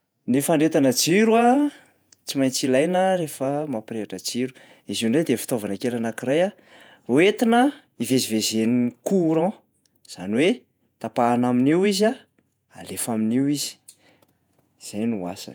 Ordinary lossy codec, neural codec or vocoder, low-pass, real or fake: none; vocoder, 48 kHz, 128 mel bands, Vocos; none; fake